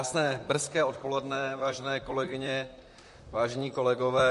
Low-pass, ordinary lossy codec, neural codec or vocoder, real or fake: 14.4 kHz; MP3, 48 kbps; vocoder, 44.1 kHz, 128 mel bands, Pupu-Vocoder; fake